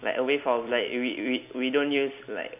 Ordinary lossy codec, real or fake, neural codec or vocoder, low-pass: none; real; none; 3.6 kHz